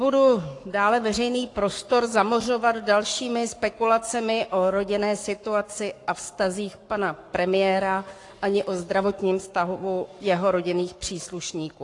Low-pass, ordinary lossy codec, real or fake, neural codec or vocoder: 10.8 kHz; AAC, 48 kbps; fake; codec, 44.1 kHz, 7.8 kbps, Pupu-Codec